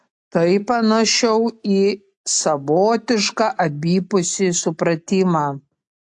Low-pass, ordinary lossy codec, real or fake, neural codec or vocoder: 10.8 kHz; AAC, 64 kbps; real; none